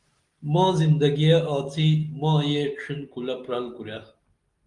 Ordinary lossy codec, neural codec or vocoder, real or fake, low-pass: Opus, 24 kbps; none; real; 10.8 kHz